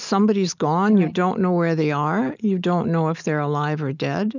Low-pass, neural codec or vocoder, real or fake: 7.2 kHz; none; real